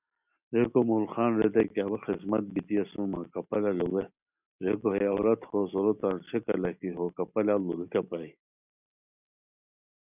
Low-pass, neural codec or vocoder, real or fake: 3.6 kHz; none; real